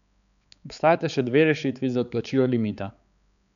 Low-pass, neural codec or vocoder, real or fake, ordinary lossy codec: 7.2 kHz; codec, 16 kHz, 4 kbps, X-Codec, HuBERT features, trained on balanced general audio; fake; none